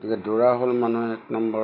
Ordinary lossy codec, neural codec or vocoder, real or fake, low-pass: none; none; real; 5.4 kHz